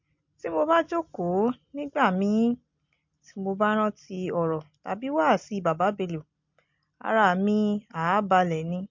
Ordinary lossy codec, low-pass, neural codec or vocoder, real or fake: MP3, 48 kbps; 7.2 kHz; none; real